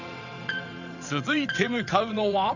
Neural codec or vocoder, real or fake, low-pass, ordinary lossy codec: vocoder, 22.05 kHz, 80 mel bands, WaveNeXt; fake; 7.2 kHz; none